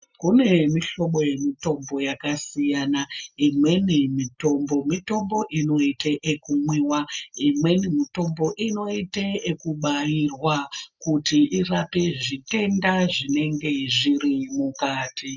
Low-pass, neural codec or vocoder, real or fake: 7.2 kHz; none; real